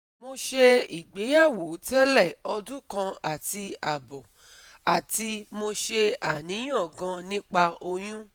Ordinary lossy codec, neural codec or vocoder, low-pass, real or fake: none; vocoder, 48 kHz, 128 mel bands, Vocos; none; fake